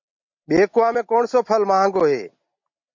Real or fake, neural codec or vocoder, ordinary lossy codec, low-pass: real; none; MP3, 48 kbps; 7.2 kHz